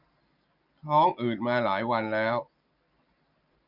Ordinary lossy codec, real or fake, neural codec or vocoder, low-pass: none; real; none; 5.4 kHz